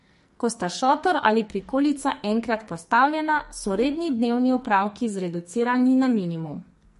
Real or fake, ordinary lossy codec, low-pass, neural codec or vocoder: fake; MP3, 48 kbps; 14.4 kHz; codec, 44.1 kHz, 2.6 kbps, SNAC